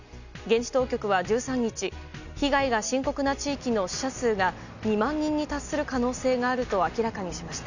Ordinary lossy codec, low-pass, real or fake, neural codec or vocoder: none; 7.2 kHz; real; none